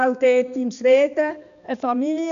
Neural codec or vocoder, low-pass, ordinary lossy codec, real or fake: codec, 16 kHz, 2 kbps, X-Codec, HuBERT features, trained on balanced general audio; 7.2 kHz; none; fake